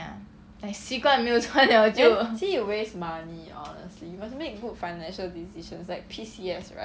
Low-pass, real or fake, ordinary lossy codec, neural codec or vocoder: none; real; none; none